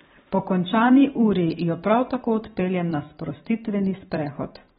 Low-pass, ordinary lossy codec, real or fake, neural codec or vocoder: 19.8 kHz; AAC, 16 kbps; fake; vocoder, 44.1 kHz, 128 mel bands every 512 samples, BigVGAN v2